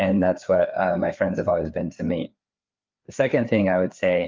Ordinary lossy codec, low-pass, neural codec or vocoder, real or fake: Opus, 24 kbps; 7.2 kHz; codec, 16 kHz, 4 kbps, FreqCodec, larger model; fake